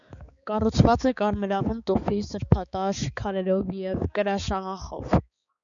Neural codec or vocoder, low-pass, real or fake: codec, 16 kHz, 4 kbps, X-Codec, HuBERT features, trained on balanced general audio; 7.2 kHz; fake